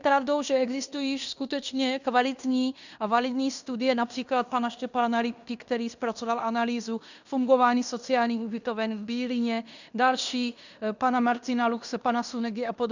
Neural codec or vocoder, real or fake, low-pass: codec, 16 kHz in and 24 kHz out, 0.9 kbps, LongCat-Audio-Codec, fine tuned four codebook decoder; fake; 7.2 kHz